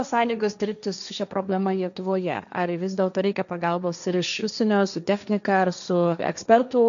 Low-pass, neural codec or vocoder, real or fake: 7.2 kHz; codec, 16 kHz, 1.1 kbps, Voila-Tokenizer; fake